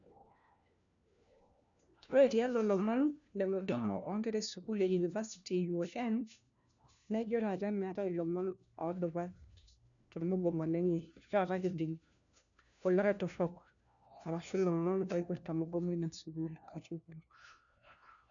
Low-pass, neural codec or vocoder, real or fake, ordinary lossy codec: 7.2 kHz; codec, 16 kHz, 1 kbps, FunCodec, trained on LibriTTS, 50 frames a second; fake; none